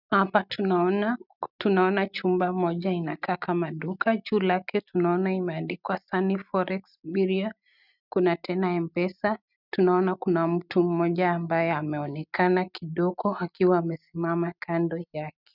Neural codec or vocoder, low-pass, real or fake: none; 5.4 kHz; real